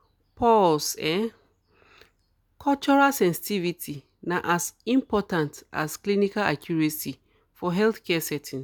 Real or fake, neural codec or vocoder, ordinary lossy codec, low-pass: real; none; none; none